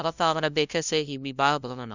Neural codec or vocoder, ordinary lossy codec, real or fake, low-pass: codec, 16 kHz, 0.5 kbps, FunCodec, trained on LibriTTS, 25 frames a second; none; fake; 7.2 kHz